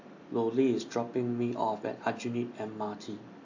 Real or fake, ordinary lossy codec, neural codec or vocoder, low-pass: real; AAC, 48 kbps; none; 7.2 kHz